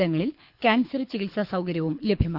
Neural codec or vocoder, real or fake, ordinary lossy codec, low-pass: codec, 24 kHz, 6 kbps, HILCodec; fake; none; 5.4 kHz